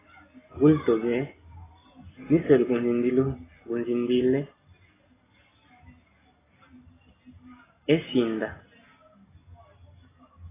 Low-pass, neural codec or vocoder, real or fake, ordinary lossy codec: 3.6 kHz; none; real; AAC, 16 kbps